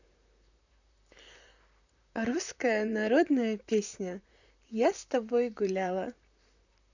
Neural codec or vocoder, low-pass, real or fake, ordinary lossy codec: vocoder, 44.1 kHz, 128 mel bands, Pupu-Vocoder; 7.2 kHz; fake; none